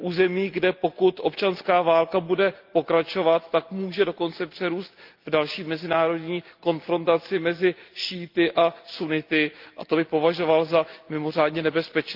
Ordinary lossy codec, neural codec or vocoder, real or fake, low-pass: Opus, 24 kbps; none; real; 5.4 kHz